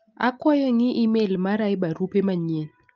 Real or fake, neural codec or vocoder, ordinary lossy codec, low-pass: real; none; Opus, 24 kbps; 7.2 kHz